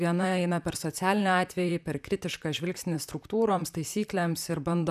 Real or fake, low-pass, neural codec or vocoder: fake; 14.4 kHz; vocoder, 44.1 kHz, 128 mel bands, Pupu-Vocoder